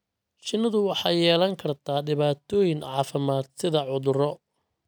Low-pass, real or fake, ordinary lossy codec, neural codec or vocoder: none; real; none; none